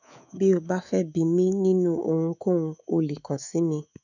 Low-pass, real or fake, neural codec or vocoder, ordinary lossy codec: 7.2 kHz; fake; codec, 24 kHz, 3.1 kbps, DualCodec; none